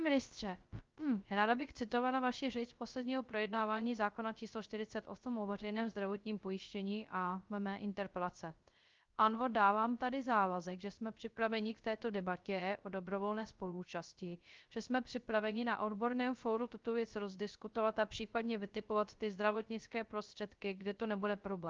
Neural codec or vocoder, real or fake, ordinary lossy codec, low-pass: codec, 16 kHz, 0.3 kbps, FocalCodec; fake; Opus, 32 kbps; 7.2 kHz